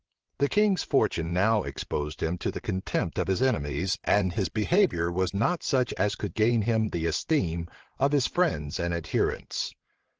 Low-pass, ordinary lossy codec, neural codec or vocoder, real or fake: 7.2 kHz; Opus, 16 kbps; vocoder, 22.05 kHz, 80 mel bands, WaveNeXt; fake